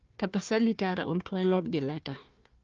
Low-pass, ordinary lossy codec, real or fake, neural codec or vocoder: 7.2 kHz; Opus, 24 kbps; fake; codec, 16 kHz, 1 kbps, FunCodec, trained on Chinese and English, 50 frames a second